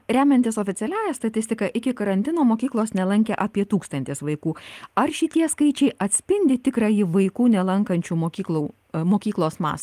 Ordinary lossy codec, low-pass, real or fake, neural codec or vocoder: Opus, 32 kbps; 14.4 kHz; real; none